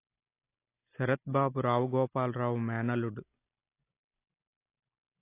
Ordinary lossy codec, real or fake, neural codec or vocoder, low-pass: AAC, 24 kbps; real; none; 3.6 kHz